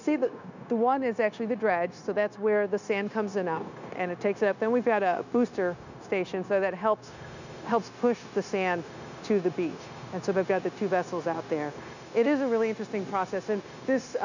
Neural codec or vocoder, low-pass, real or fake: codec, 16 kHz, 0.9 kbps, LongCat-Audio-Codec; 7.2 kHz; fake